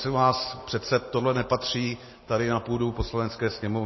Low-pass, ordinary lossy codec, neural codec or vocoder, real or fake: 7.2 kHz; MP3, 24 kbps; none; real